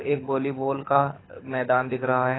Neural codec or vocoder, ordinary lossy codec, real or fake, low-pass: codec, 24 kHz, 6 kbps, HILCodec; AAC, 16 kbps; fake; 7.2 kHz